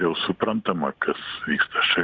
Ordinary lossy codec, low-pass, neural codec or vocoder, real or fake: Opus, 64 kbps; 7.2 kHz; none; real